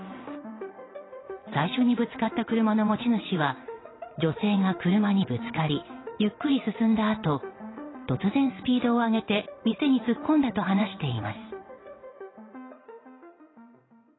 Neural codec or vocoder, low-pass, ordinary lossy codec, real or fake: vocoder, 22.05 kHz, 80 mel bands, WaveNeXt; 7.2 kHz; AAC, 16 kbps; fake